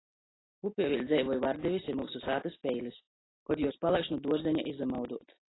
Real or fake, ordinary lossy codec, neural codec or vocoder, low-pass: real; AAC, 16 kbps; none; 7.2 kHz